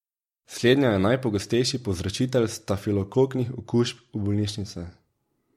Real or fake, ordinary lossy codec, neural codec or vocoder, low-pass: fake; MP3, 64 kbps; vocoder, 44.1 kHz, 128 mel bands every 256 samples, BigVGAN v2; 19.8 kHz